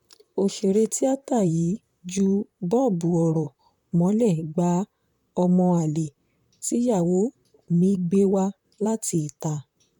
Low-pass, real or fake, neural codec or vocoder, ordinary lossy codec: 19.8 kHz; fake; vocoder, 44.1 kHz, 128 mel bands, Pupu-Vocoder; none